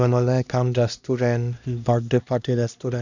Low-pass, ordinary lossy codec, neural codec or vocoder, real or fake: 7.2 kHz; none; codec, 16 kHz, 1 kbps, X-Codec, HuBERT features, trained on LibriSpeech; fake